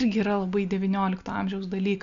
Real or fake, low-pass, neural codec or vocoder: real; 7.2 kHz; none